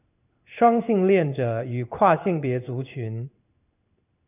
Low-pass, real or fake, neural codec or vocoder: 3.6 kHz; fake; codec, 16 kHz in and 24 kHz out, 1 kbps, XY-Tokenizer